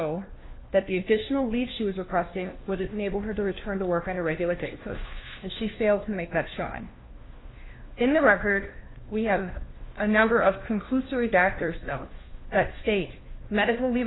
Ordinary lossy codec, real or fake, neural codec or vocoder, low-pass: AAC, 16 kbps; fake; codec, 16 kHz, 1 kbps, FunCodec, trained on LibriTTS, 50 frames a second; 7.2 kHz